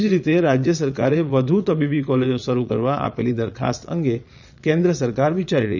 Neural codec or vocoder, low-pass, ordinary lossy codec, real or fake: vocoder, 22.05 kHz, 80 mel bands, Vocos; 7.2 kHz; none; fake